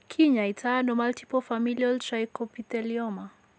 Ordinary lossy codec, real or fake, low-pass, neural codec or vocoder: none; real; none; none